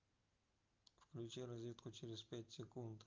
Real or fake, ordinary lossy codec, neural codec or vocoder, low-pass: real; Opus, 24 kbps; none; 7.2 kHz